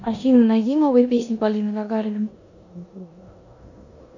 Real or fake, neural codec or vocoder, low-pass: fake; codec, 16 kHz in and 24 kHz out, 0.9 kbps, LongCat-Audio-Codec, four codebook decoder; 7.2 kHz